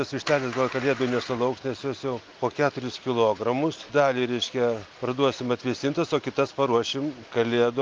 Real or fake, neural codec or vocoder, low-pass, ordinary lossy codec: real; none; 7.2 kHz; Opus, 32 kbps